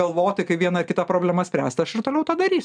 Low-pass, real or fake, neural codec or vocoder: 9.9 kHz; real; none